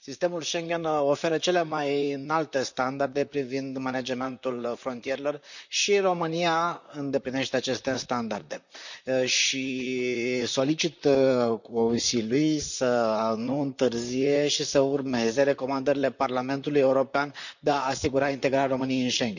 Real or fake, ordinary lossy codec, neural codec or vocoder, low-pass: fake; none; vocoder, 44.1 kHz, 128 mel bands, Pupu-Vocoder; 7.2 kHz